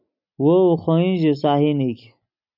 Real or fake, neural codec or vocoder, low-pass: real; none; 5.4 kHz